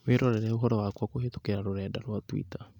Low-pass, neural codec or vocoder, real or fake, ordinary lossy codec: 19.8 kHz; none; real; none